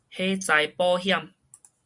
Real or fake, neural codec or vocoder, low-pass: real; none; 10.8 kHz